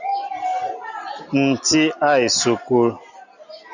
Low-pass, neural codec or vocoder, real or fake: 7.2 kHz; none; real